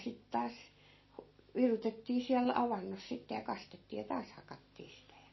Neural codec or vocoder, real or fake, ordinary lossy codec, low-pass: none; real; MP3, 24 kbps; 7.2 kHz